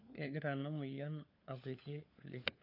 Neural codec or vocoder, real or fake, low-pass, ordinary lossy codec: codec, 16 kHz, 4 kbps, FunCodec, trained on LibriTTS, 50 frames a second; fake; 5.4 kHz; AAC, 48 kbps